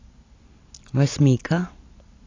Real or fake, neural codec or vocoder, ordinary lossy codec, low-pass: real; none; AAC, 32 kbps; 7.2 kHz